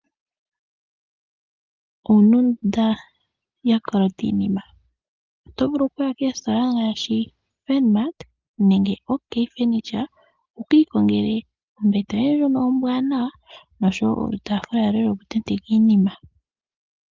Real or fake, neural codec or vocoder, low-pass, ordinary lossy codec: real; none; 7.2 kHz; Opus, 32 kbps